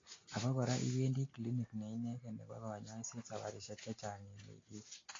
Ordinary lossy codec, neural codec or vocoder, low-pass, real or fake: AAC, 32 kbps; none; 7.2 kHz; real